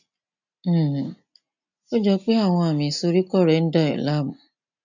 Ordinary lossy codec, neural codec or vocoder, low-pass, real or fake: none; none; 7.2 kHz; real